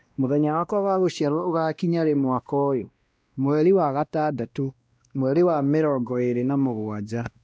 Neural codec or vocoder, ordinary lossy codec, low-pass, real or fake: codec, 16 kHz, 1 kbps, X-Codec, WavLM features, trained on Multilingual LibriSpeech; none; none; fake